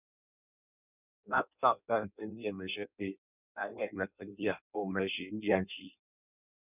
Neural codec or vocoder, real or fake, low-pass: codec, 16 kHz in and 24 kHz out, 0.6 kbps, FireRedTTS-2 codec; fake; 3.6 kHz